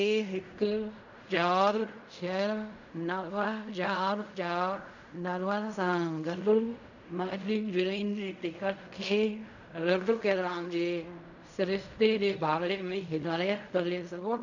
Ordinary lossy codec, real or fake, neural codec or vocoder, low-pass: none; fake; codec, 16 kHz in and 24 kHz out, 0.4 kbps, LongCat-Audio-Codec, fine tuned four codebook decoder; 7.2 kHz